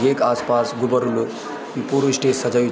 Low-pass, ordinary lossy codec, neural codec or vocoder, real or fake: none; none; none; real